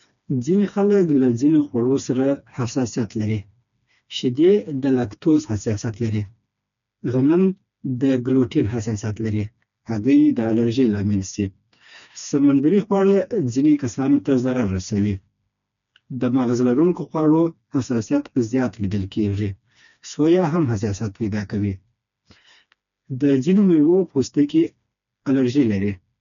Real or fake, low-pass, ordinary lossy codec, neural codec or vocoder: fake; 7.2 kHz; none; codec, 16 kHz, 2 kbps, FreqCodec, smaller model